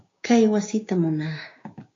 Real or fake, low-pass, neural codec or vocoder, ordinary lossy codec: fake; 7.2 kHz; codec, 16 kHz, 6 kbps, DAC; AAC, 48 kbps